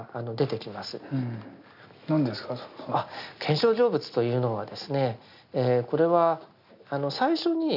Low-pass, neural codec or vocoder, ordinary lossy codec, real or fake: 5.4 kHz; none; none; real